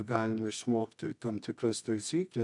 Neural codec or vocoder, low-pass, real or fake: codec, 24 kHz, 0.9 kbps, WavTokenizer, medium music audio release; 10.8 kHz; fake